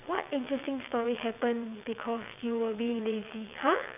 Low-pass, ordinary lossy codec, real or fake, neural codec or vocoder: 3.6 kHz; none; fake; vocoder, 22.05 kHz, 80 mel bands, WaveNeXt